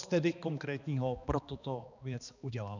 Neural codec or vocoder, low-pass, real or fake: codec, 16 kHz, 2 kbps, X-Codec, HuBERT features, trained on balanced general audio; 7.2 kHz; fake